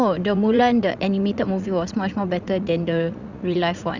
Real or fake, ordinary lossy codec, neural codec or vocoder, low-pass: fake; Opus, 64 kbps; vocoder, 44.1 kHz, 80 mel bands, Vocos; 7.2 kHz